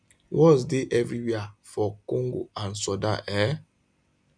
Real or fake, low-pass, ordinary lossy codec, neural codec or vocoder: real; 9.9 kHz; none; none